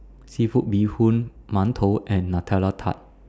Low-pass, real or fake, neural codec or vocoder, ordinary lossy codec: none; real; none; none